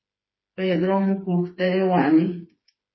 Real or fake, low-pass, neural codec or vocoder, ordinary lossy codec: fake; 7.2 kHz; codec, 16 kHz, 4 kbps, FreqCodec, smaller model; MP3, 24 kbps